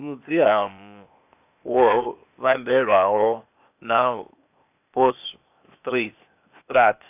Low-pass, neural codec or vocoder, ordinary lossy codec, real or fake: 3.6 kHz; codec, 16 kHz, 0.8 kbps, ZipCodec; none; fake